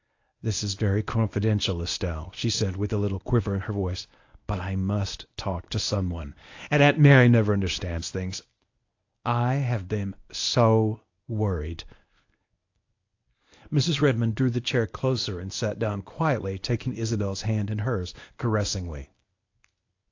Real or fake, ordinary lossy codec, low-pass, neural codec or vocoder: fake; AAC, 48 kbps; 7.2 kHz; codec, 24 kHz, 0.9 kbps, WavTokenizer, medium speech release version 1